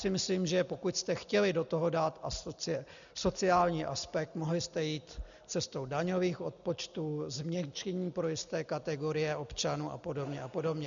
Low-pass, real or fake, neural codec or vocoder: 7.2 kHz; real; none